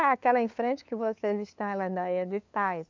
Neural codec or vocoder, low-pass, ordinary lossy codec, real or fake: codec, 16 kHz, 2 kbps, FunCodec, trained on LibriTTS, 25 frames a second; 7.2 kHz; MP3, 64 kbps; fake